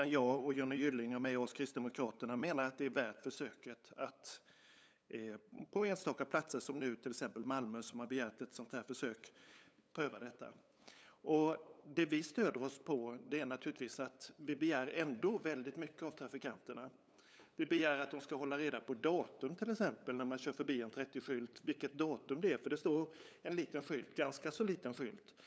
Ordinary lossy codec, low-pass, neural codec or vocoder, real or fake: none; none; codec, 16 kHz, 8 kbps, FunCodec, trained on LibriTTS, 25 frames a second; fake